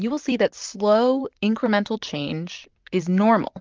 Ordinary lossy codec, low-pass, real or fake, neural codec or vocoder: Opus, 24 kbps; 7.2 kHz; fake; vocoder, 44.1 kHz, 128 mel bands, Pupu-Vocoder